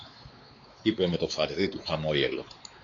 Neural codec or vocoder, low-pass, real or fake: codec, 16 kHz, 4 kbps, X-Codec, WavLM features, trained on Multilingual LibriSpeech; 7.2 kHz; fake